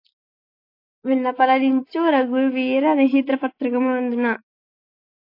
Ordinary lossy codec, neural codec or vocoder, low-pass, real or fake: AAC, 32 kbps; none; 5.4 kHz; real